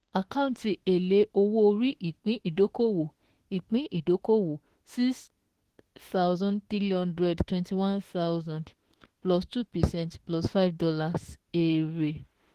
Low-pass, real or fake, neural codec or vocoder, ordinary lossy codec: 14.4 kHz; fake; autoencoder, 48 kHz, 32 numbers a frame, DAC-VAE, trained on Japanese speech; Opus, 16 kbps